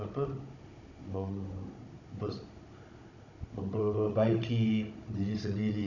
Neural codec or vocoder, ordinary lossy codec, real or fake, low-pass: codec, 16 kHz, 16 kbps, FunCodec, trained on Chinese and English, 50 frames a second; none; fake; 7.2 kHz